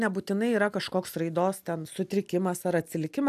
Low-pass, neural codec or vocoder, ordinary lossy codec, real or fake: 14.4 kHz; none; AAC, 96 kbps; real